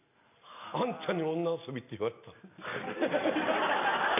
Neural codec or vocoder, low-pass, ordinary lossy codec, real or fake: none; 3.6 kHz; none; real